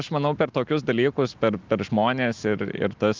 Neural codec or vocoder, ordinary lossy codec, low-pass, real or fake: none; Opus, 24 kbps; 7.2 kHz; real